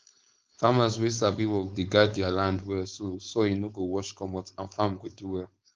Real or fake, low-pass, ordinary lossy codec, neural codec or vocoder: fake; 7.2 kHz; Opus, 24 kbps; codec, 16 kHz, 4.8 kbps, FACodec